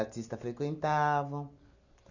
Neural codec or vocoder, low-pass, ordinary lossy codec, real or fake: none; 7.2 kHz; none; real